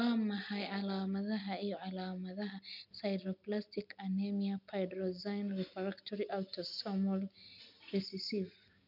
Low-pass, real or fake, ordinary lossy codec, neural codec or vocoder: 5.4 kHz; real; none; none